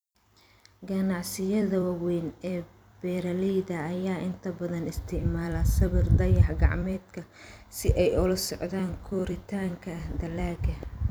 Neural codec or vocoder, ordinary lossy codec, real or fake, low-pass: vocoder, 44.1 kHz, 128 mel bands every 256 samples, BigVGAN v2; none; fake; none